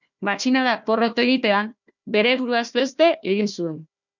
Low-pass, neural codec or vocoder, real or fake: 7.2 kHz; codec, 16 kHz, 1 kbps, FunCodec, trained on Chinese and English, 50 frames a second; fake